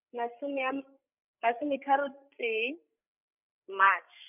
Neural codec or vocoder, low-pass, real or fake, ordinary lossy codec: codec, 16 kHz, 8 kbps, FreqCodec, larger model; 3.6 kHz; fake; none